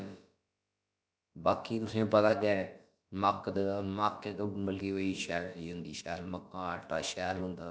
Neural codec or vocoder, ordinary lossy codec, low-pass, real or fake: codec, 16 kHz, about 1 kbps, DyCAST, with the encoder's durations; none; none; fake